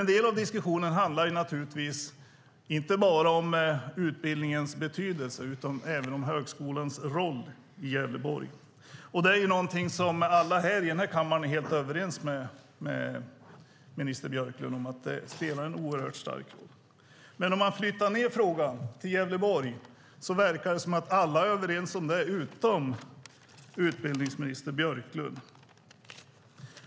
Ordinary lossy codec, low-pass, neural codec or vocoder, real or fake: none; none; none; real